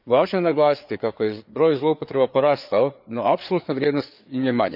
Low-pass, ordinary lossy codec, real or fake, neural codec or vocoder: 5.4 kHz; none; fake; codec, 16 kHz, 4 kbps, FreqCodec, larger model